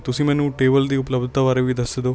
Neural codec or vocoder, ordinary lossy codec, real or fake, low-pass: none; none; real; none